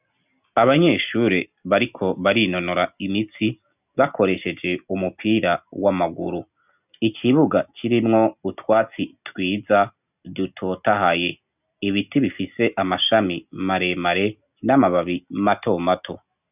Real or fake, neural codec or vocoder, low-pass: real; none; 3.6 kHz